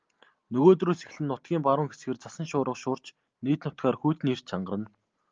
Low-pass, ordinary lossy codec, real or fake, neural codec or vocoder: 7.2 kHz; Opus, 32 kbps; real; none